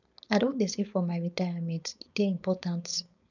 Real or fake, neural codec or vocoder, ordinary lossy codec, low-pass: fake; codec, 16 kHz, 4.8 kbps, FACodec; none; 7.2 kHz